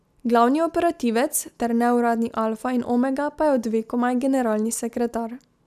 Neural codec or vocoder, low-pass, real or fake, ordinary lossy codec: none; 14.4 kHz; real; none